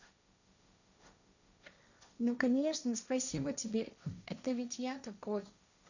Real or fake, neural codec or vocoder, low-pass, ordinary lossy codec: fake; codec, 16 kHz, 1.1 kbps, Voila-Tokenizer; 7.2 kHz; none